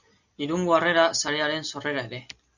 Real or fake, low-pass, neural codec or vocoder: real; 7.2 kHz; none